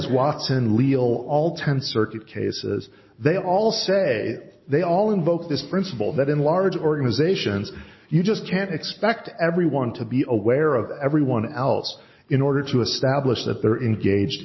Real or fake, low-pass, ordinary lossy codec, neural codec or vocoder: real; 7.2 kHz; MP3, 24 kbps; none